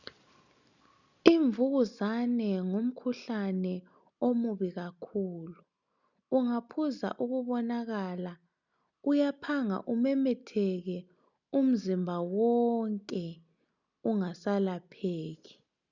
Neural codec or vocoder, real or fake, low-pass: none; real; 7.2 kHz